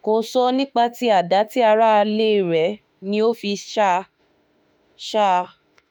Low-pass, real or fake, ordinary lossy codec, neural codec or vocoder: none; fake; none; autoencoder, 48 kHz, 32 numbers a frame, DAC-VAE, trained on Japanese speech